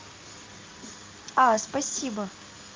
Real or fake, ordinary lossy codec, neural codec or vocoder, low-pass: real; Opus, 24 kbps; none; 7.2 kHz